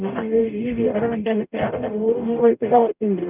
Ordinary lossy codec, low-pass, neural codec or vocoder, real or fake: none; 3.6 kHz; codec, 44.1 kHz, 0.9 kbps, DAC; fake